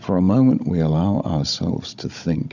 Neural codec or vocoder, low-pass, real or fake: codec, 16 kHz, 16 kbps, FunCodec, trained on Chinese and English, 50 frames a second; 7.2 kHz; fake